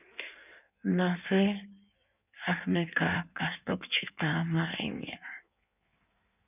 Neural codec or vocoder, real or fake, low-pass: codec, 16 kHz in and 24 kHz out, 1.1 kbps, FireRedTTS-2 codec; fake; 3.6 kHz